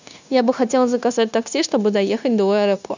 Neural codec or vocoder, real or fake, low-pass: codec, 16 kHz, 0.9 kbps, LongCat-Audio-Codec; fake; 7.2 kHz